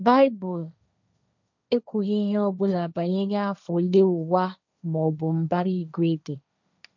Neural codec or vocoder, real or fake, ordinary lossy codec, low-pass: codec, 16 kHz, 1.1 kbps, Voila-Tokenizer; fake; none; 7.2 kHz